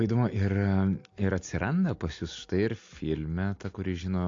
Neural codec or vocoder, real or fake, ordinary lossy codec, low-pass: none; real; AAC, 48 kbps; 7.2 kHz